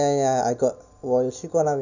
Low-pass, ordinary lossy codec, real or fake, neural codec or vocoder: 7.2 kHz; none; real; none